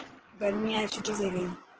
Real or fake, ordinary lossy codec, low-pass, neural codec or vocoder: fake; Opus, 16 kbps; 7.2 kHz; codec, 16 kHz in and 24 kHz out, 2.2 kbps, FireRedTTS-2 codec